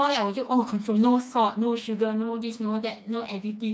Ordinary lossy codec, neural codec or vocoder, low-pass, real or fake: none; codec, 16 kHz, 2 kbps, FreqCodec, smaller model; none; fake